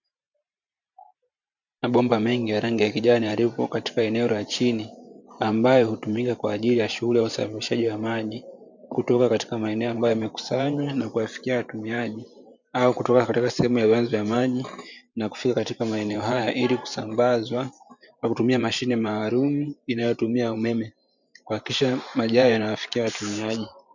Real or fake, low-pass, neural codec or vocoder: fake; 7.2 kHz; vocoder, 44.1 kHz, 128 mel bands every 512 samples, BigVGAN v2